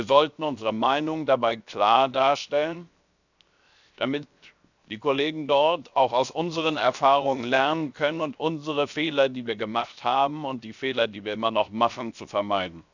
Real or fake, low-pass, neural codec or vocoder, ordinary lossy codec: fake; 7.2 kHz; codec, 16 kHz, 0.7 kbps, FocalCodec; none